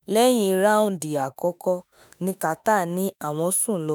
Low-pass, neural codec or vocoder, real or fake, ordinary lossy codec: none; autoencoder, 48 kHz, 32 numbers a frame, DAC-VAE, trained on Japanese speech; fake; none